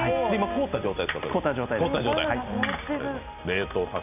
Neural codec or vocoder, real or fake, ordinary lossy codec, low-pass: none; real; none; 3.6 kHz